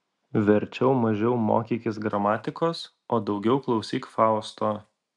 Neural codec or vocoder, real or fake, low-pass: none; real; 10.8 kHz